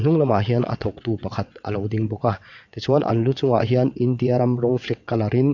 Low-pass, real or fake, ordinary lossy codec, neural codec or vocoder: 7.2 kHz; fake; none; vocoder, 22.05 kHz, 80 mel bands, WaveNeXt